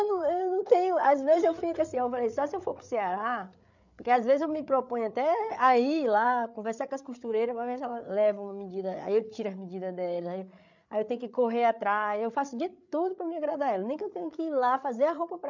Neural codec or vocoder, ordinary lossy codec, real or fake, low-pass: codec, 16 kHz, 8 kbps, FreqCodec, larger model; none; fake; 7.2 kHz